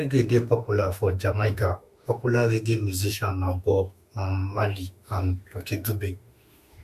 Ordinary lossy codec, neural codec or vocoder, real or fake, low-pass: none; autoencoder, 48 kHz, 32 numbers a frame, DAC-VAE, trained on Japanese speech; fake; 14.4 kHz